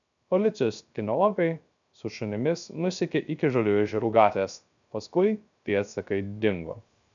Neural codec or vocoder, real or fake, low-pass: codec, 16 kHz, 0.3 kbps, FocalCodec; fake; 7.2 kHz